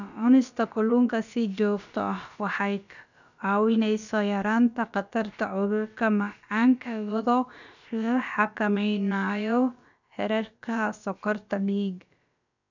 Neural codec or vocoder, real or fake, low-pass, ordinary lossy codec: codec, 16 kHz, about 1 kbps, DyCAST, with the encoder's durations; fake; 7.2 kHz; none